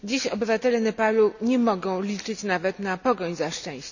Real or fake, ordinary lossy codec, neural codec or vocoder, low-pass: real; none; none; 7.2 kHz